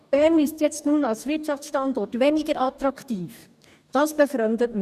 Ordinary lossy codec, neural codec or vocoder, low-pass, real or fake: none; codec, 44.1 kHz, 2.6 kbps, DAC; 14.4 kHz; fake